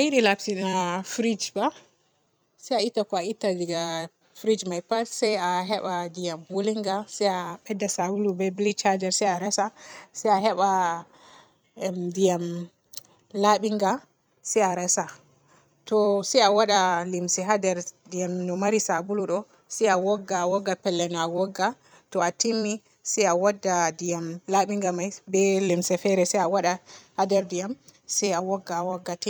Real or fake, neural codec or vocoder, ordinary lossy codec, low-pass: fake; vocoder, 44.1 kHz, 128 mel bands every 256 samples, BigVGAN v2; none; none